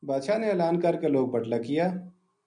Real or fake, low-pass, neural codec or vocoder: real; 9.9 kHz; none